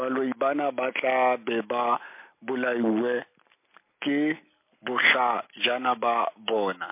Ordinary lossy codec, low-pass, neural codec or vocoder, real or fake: MP3, 24 kbps; 3.6 kHz; none; real